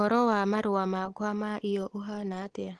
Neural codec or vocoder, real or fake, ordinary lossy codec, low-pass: none; real; Opus, 16 kbps; 10.8 kHz